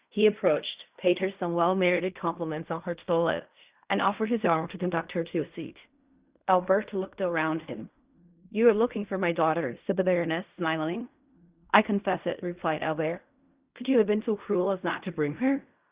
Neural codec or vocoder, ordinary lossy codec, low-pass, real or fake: codec, 16 kHz in and 24 kHz out, 0.4 kbps, LongCat-Audio-Codec, fine tuned four codebook decoder; Opus, 64 kbps; 3.6 kHz; fake